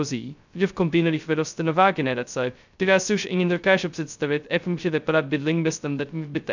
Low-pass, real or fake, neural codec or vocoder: 7.2 kHz; fake; codec, 16 kHz, 0.2 kbps, FocalCodec